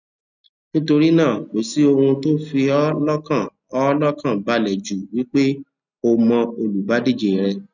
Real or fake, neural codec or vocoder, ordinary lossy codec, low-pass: real; none; none; 7.2 kHz